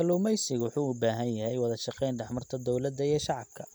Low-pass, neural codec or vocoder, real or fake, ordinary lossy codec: none; none; real; none